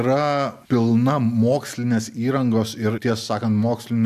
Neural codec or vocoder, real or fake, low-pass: none; real; 14.4 kHz